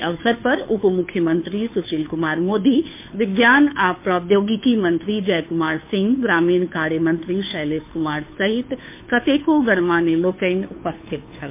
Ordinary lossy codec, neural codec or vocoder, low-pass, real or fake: MP3, 24 kbps; codec, 16 kHz, 2 kbps, FunCodec, trained on Chinese and English, 25 frames a second; 3.6 kHz; fake